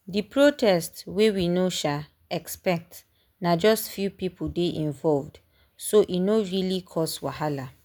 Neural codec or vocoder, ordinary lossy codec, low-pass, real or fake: none; none; none; real